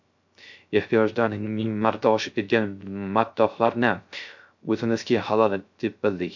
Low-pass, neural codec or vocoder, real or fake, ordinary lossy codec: 7.2 kHz; codec, 16 kHz, 0.3 kbps, FocalCodec; fake; MP3, 64 kbps